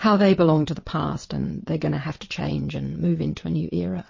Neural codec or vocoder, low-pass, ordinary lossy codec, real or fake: vocoder, 22.05 kHz, 80 mel bands, WaveNeXt; 7.2 kHz; MP3, 32 kbps; fake